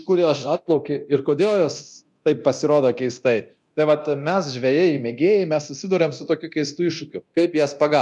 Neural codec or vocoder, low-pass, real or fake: codec, 24 kHz, 0.9 kbps, DualCodec; 10.8 kHz; fake